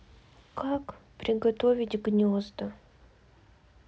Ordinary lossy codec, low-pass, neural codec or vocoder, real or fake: none; none; none; real